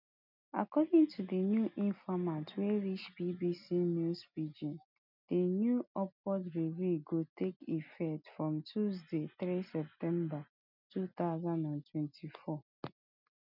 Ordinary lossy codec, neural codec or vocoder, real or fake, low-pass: none; none; real; 5.4 kHz